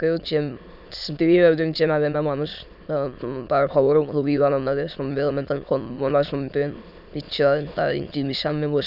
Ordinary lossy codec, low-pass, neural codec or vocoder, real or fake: none; 5.4 kHz; autoencoder, 22.05 kHz, a latent of 192 numbers a frame, VITS, trained on many speakers; fake